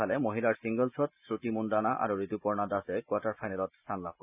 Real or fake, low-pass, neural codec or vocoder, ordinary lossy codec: real; 3.6 kHz; none; none